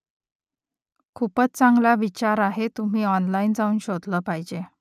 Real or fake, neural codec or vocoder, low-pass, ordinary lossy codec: real; none; 14.4 kHz; none